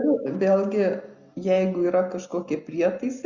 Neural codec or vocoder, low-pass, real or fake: none; 7.2 kHz; real